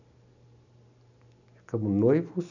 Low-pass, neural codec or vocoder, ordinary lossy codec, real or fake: 7.2 kHz; none; none; real